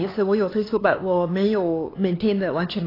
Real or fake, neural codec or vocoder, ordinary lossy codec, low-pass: fake; codec, 16 kHz, 4 kbps, X-Codec, HuBERT features, trained on LibriSpeech; AAC, 24 kbps; 5.4 kHz